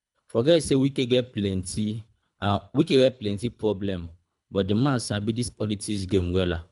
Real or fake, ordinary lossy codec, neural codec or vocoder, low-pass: fake; none; codec, 24 kHz, 3 kbps, HILCodec; 10.8 kHz